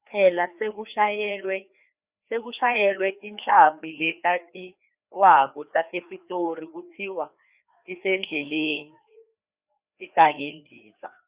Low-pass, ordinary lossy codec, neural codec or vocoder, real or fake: 3.6 kHz; Opus, 64 kbps; codec, 16 kHz, 2 kbps, FreqCodec, larger model; fake